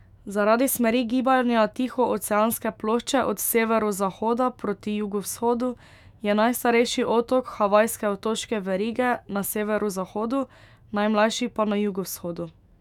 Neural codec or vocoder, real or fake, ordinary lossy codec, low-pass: autoencoder, 48 kHz, 128 numbers a frame, DAC-VAE, trained on Japanese speech; fake; none; 19.8 kHz